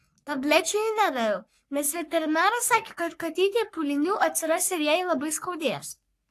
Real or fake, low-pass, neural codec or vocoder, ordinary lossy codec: fake; 14.4 kHz; codec, 44.1 kHz, 3.4 kbps, Pupu-Codec; AAC, 64 kbps